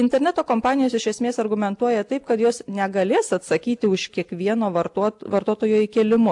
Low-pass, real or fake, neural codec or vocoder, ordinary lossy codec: 10.8 kHz; real; none; AAC, 64 kbps